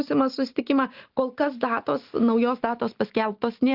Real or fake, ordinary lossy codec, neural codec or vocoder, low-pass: real; Opus, 32 kbps; none; 5.4 kHz